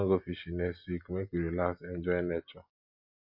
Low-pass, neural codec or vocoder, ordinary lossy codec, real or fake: 5.4 kHz; none; MP3, 32 kbps; real